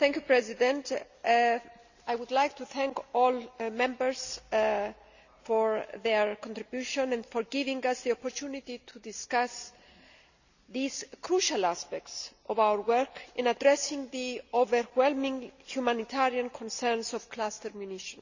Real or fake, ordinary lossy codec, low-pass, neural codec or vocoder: real; none; 7.2 kHz; none